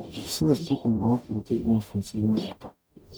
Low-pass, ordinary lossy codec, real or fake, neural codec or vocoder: none; none; fake; codec, 44.1 kHz, 0.9 kbps, DAC